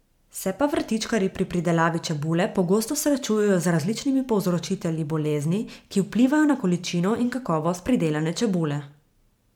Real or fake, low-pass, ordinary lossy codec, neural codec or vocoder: fake; 19.8 kHz; MP3, 96 kbps; vocoder, 44.1 kHz, 128 mel bands every 512 samples, BigVGAN v2